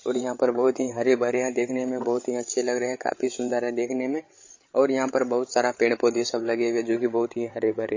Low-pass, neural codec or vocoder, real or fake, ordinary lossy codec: 7.2 kHz; codec, 16 kHz, 16 kbps, FreqCodec, larger model; fake; MP3, 32 kbps